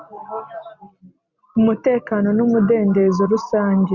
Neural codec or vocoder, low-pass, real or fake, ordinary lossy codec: none; 7.2 kHz; real; Opus, 64 kbps